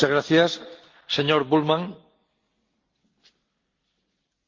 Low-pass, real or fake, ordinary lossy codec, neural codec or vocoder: 7.2 kHz; real; Opus, 24 kbps; none